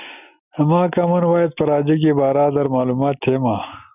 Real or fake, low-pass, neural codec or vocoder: real; 3.6 kHz; none